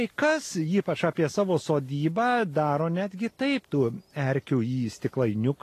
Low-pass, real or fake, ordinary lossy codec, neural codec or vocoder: 14.4 kHz; real; AAC, 48 kbps; none